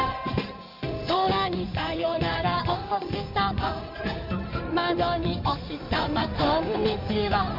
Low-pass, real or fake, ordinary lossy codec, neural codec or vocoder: 5.4 kHz; fake; none; codec, 16 kHz in and 24 kHz out, 2.2 kbps, FireRedTTS-2 codec